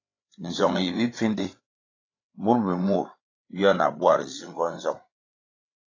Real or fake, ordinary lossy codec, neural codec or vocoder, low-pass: fake; AAC, 32 kbps; codec, 16 kHz, 8 kbps, FreqCodec, larger model; 7.2 kHz